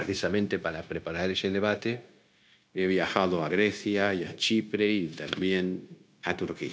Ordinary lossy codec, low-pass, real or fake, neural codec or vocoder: none; none; fake; codec, 16 kHz, 0.9 kbps, LongCat-Audio-Codec